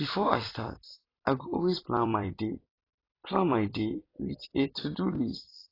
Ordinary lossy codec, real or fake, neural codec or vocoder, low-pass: AAC, 24 kbps; fake; vocoder, 44.1 kHz, 128 mel bands every 256 samples, BigVGAN v2; 5.4 kHz